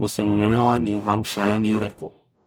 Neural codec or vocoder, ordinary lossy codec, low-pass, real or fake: codec, 44.1 kHz, 0.9 kbps, DAC; none; none; fake